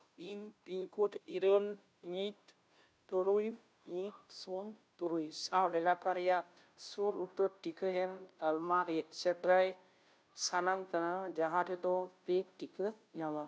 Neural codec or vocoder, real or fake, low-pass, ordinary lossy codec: codec, 16 kHz, 0.5 kbps, FunCodec, trained on Chinese and English, 25 frames a second; fake; none; none